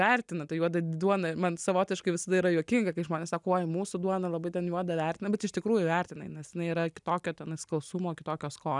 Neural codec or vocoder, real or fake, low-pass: none; real; 10.8 kHz